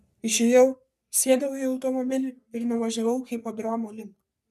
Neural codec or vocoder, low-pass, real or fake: codec, 44.1 kHz, 3.4 kbps, Pupu-Codec; 14.4 kHz; fake